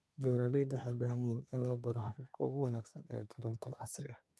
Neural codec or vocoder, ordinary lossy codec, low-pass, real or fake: codec, 24 kHz, 1 kbps, SNAC; none; none; fake